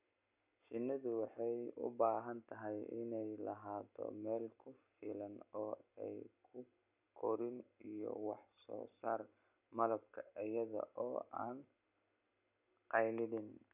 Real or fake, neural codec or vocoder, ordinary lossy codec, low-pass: real; none; none; 3.6 kHz